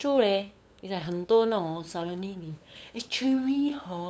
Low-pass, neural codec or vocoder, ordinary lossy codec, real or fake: none; codec, 16 kHz, 8 kbps, FunCodec, trained on LibriTTS, 25 frames a second; none; fake